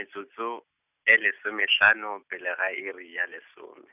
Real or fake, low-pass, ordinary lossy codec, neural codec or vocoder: real; 3.6 kHz; none; none